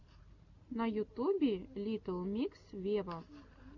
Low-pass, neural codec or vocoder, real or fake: 7.2 kHz; none; real